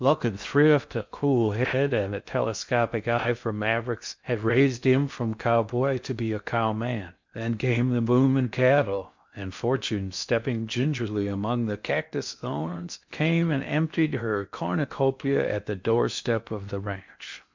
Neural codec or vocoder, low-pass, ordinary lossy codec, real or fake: codec, 16 kHz in and 24 kHz out, 0.6 kbps, FocalCodec, streaming, 4096 codes; 7.2 kHz; MP3, 64 kbps; fake